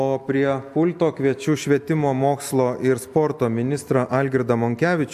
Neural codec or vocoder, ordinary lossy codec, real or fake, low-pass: none; MP3, 96 kbps; real; 14.4 kHz